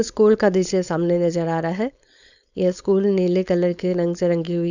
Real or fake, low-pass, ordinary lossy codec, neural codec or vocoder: fake; 7.2 kHz; none; codec, 16 kHz, 4.8 kbps, FACodec